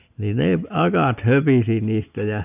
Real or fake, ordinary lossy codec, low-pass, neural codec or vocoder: real; none; 3.6 kHz; none